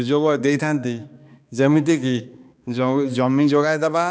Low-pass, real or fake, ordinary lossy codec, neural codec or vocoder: none; fake; none; codec, 16 kHz, 2 kbps, X-Codec, HuBERT features, trained on balanced general audio